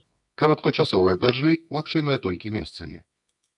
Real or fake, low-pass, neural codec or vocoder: fake; 10.8 kHz; codec, 24 kHz, 0.9 kbps, WavTokenizer, medium music audio release